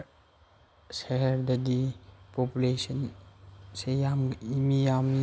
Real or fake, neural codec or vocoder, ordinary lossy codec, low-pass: real; none; none; none